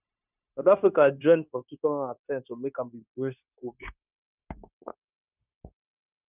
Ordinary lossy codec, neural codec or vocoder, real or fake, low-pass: none; codec, 16 kHz, 0.9 kbps, LongCat-Audio-Codec; fake; 3.6 kHz